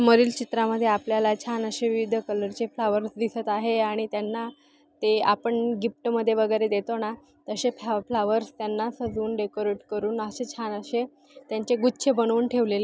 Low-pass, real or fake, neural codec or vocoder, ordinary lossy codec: none; real; none; none